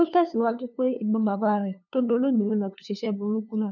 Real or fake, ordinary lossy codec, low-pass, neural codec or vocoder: fake; none; 7.2 kHz; codec, 16 kHz, 2 kbps, FunCodec, trained on LibriTTS, 25 frames a second